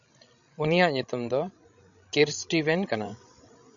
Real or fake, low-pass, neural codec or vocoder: fake; 7.2 kHz; codec, 16 kHz, 16 kbps, FreqCodec, larger model